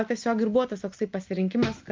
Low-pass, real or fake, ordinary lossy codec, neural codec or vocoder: 7.2 kHz; real; Opus, 32 kbps; none